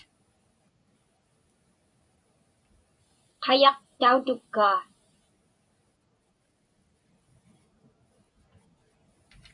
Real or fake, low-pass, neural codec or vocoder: real; 10.8 kHz; none